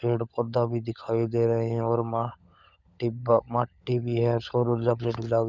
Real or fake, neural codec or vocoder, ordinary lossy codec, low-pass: fake; codec, 16 kHz, 16 kbps, FunCodec, trained on LibriTTS, 50 frames a second; none; 7.2 kHz